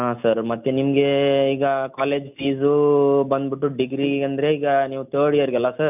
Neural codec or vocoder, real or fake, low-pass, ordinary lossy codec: none; real; 3.6 kHz; none